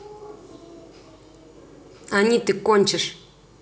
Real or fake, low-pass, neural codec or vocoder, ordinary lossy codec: real; none; none; none